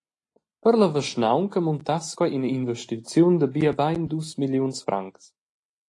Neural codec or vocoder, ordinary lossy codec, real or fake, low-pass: none; AAC, 48 kbps; real; 10.8 kHz